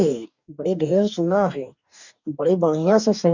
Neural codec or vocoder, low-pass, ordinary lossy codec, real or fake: codec, 44.1 kHz, 2.6 kbps, DAC; 7.2 kHz; none; fake